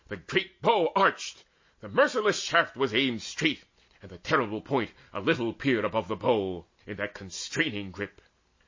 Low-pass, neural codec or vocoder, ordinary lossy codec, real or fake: 7.2 kHz; none; MP3, 32 kbps; real